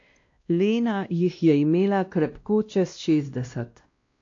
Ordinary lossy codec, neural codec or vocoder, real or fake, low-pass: AAC, 48 kbps; codec, 16 kHz, 1 kbps, X-Codec, WavLM features, trained on Multilingual LibriSpeech; fake; 7.2 kHz